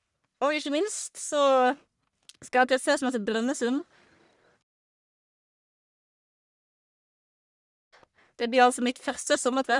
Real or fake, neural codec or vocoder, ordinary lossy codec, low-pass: fake; codec, 44.1 kHz, 1.7 kbps, Pupu-Codec; none; 10.8 kHz